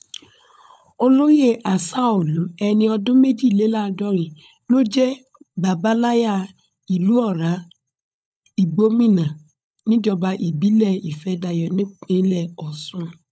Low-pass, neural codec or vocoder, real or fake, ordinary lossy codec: none; codec, 16 kHz, 16 kbps, FunCodec, trained on LibriTTS, 50 frames a second; fake; none